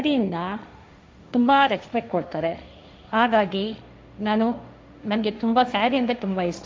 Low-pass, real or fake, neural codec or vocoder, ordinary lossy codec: none; fake; codec, 16 kHz, 1.1 kbps, Voila-Tokenizer; none